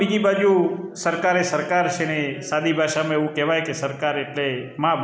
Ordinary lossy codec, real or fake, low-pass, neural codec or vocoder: none; real; none; none